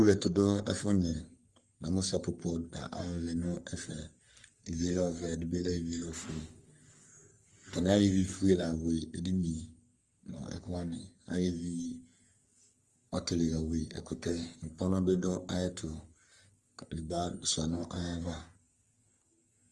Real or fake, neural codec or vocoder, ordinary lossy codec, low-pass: fake; codec, 44.1 kHz, 3.4 kbps, Pupu-Codec; Opus, 24 kbps; 10.8 kHz